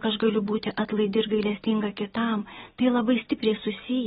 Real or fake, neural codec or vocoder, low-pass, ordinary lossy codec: real; none; 10.8 kHz; AAC, 16 kbps